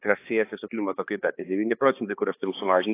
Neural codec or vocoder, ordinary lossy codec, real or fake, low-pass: codec, 16 kHz, 2 kbps, X-Codec, HuBERT features, trained on balanced general audio; AAC, 24 kbps; fake; 3.6 kHz